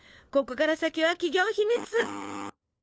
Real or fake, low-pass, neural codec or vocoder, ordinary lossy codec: fake; none; codec, 16 kHz, 4 kbps, FunCodec, trained on LibriTTS, 50 frames a second; none